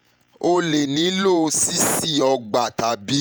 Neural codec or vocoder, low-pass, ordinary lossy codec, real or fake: vocoder, 48 kHz, 128 mel bands, Vocos; none; none; fake